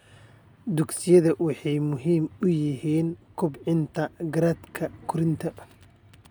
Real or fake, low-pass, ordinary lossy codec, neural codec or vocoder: real; none; none; none